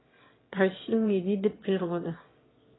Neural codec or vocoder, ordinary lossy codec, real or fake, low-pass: autoencoder, 22.05 kHz, a latent of 192 numbers a frame, VITS, trained on one speaker; AAC, 16 kbps; fake; 7.2 kHz